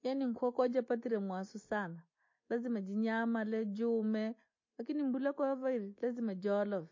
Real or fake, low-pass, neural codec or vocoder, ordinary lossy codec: real; 7.2 kHz; none; MP3, 32 kbps